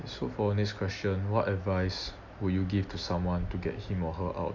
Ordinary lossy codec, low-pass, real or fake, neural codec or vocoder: none; 7.2 kHz; real; none